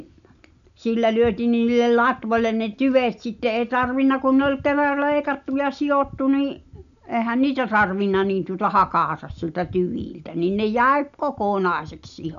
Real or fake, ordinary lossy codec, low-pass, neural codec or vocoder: real; none; 7.2 kHz; none